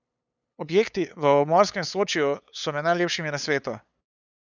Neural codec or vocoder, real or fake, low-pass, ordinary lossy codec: codec, 16 kHz, 8 kbps, FunCodec, trained on LibriTTS, 25 frames a second; fake; 7.2 kHz; none